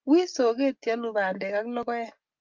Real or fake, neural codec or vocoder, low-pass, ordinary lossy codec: real; none; 7.2 kHz; Opus, 32 kbps